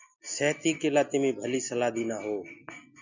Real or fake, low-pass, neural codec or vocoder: real; 7.2 kHz; none